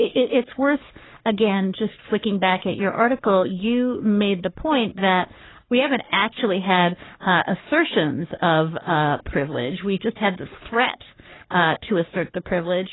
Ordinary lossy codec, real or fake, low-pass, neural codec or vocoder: AAC, 16 kbps; fake; 7.2 kHz; codec, 44.1 kHz, 3.4 kbps, Pupu-Codec